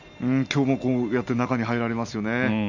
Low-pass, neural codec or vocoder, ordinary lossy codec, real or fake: 7.2 kHz; none; none; real